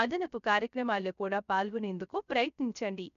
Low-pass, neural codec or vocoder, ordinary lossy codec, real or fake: 7.2 kHz; codec, 16 kHz, 0.3 kbps, FocalCodec; MP3, 96 kbps; fake